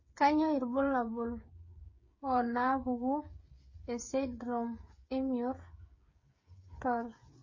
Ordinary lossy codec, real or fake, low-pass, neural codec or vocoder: MP3, 32 kbps; fake; 7.2 kHz; codec, 16 kHz, 8 kbps, FreqCodec, smaller model